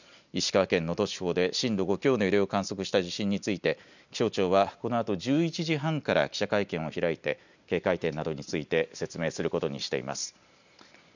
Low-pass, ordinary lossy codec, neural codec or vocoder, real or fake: 7.2 kHz; none; none; real